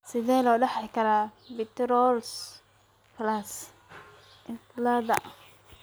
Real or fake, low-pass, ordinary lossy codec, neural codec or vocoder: real; none; none; none